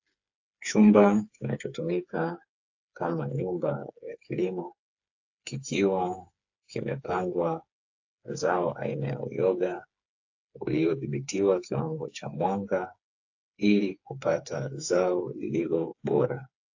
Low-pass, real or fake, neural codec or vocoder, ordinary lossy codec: 7.2 kHz; fake; codec, 16 kHz, 4 kbps, FreqCodec, smaller model; AAC, 48 kbps